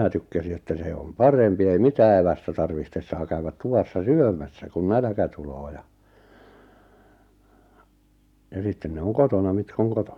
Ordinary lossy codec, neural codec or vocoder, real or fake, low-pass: none; none; real; 19.8 kHz